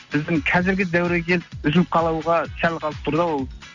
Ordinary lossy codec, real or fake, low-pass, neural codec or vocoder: none; real; 7.2 kHz; none